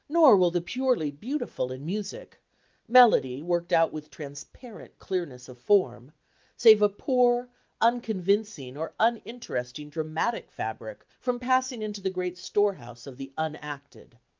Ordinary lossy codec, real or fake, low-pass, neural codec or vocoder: Opus, 24 kbps; real; 7.2 kHz; none